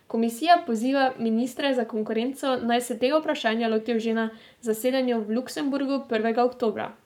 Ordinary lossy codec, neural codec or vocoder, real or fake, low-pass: none; codec, 44.1 kHz, 7.8 kbps, Pupu-Codec; fake; 19.8 kHz